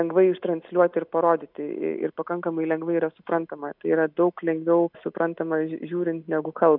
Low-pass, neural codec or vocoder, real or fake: 5.4 kHz; none; real